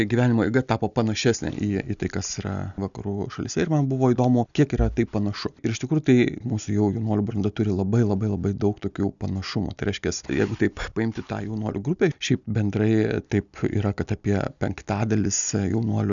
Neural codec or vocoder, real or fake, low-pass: none; real; 7.2 kHz